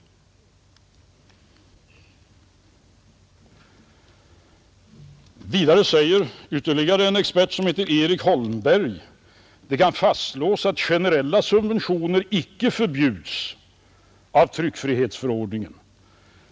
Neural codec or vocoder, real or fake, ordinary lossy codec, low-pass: none; real; none; none